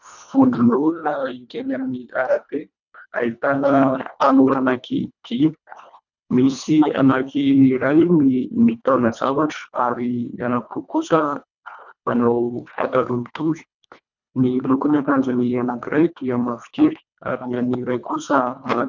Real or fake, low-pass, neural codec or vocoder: fake; 7.2 kHz; codec, 24 kHz, 1.5 kbps, HILCodec